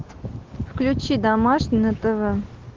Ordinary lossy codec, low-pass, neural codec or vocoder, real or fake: Opus, 16 kbps; 7.2 kHz; none; real